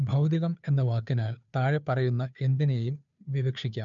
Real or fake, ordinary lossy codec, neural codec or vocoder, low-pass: fake; none; codec, 16 kHz, 2 kbps, FunCodec, trained on Chinese and English, 25 frames a second; 7.2 kHz